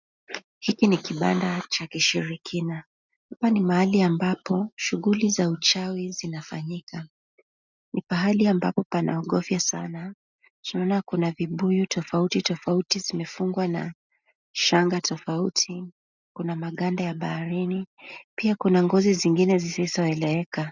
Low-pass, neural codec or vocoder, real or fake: 7.2 kHz; none; real